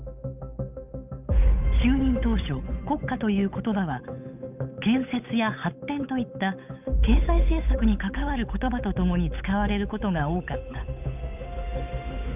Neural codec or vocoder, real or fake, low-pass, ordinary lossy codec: codec, 16 kHz, 8 kbps, FunCodec, trained on Chinese and English, 25 frames a second; fake; 3.6 kHz; none